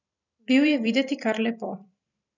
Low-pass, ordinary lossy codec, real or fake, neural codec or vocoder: 7.2 kHz; none; fake; vocoder, 44.1 kHz, 128 mel bands every 256 samples, BigVGAN v2